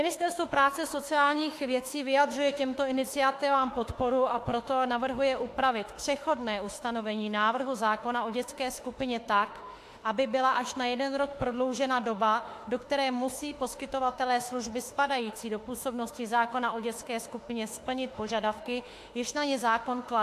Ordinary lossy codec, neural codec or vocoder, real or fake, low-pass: AAC, 64 kbps; autoencoder, 48 kHz, 32 numbers a frame, DAC-VAE, trained on Japanese speech; fake; 14.4 kHz